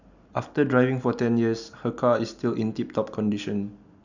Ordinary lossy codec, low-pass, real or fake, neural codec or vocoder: none; 7.2 kHz; real; none